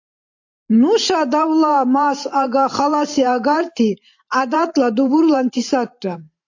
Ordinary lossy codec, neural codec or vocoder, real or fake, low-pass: AAC, 48 kbps; vocoder, 44.1 kHz, 128 mel bands every 512 samples, BigVGAN v2; fake; 7.2 kHz